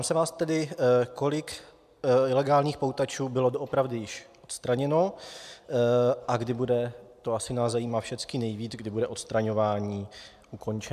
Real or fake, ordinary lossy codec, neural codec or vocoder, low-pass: real; AAC, 96 kbps; none; 14.4 kHz